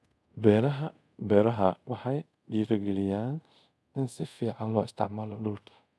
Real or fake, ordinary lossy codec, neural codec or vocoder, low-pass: fake; none; codec, 24 kHz, 0.5 kbps, DualCodec; none